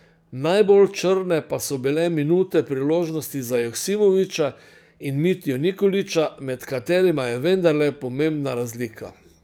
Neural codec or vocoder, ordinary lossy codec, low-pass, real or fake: codec, 44.1 kHz, 7.8 kbps, DAC; none; 19.8 kHz; fake